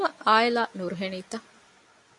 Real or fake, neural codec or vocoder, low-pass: real; none; 10.8 kHz